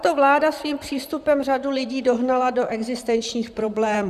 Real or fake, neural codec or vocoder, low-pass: fake; vocoder, 44.1 kHz, 128 mel bands, Pupu-Vocoder; 14.4 kHz